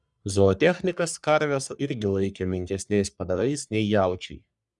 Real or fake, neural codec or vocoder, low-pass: fake; codec, 44.1 kHz, 3.4 kbps, Pupu-Codec; 10.8 kHz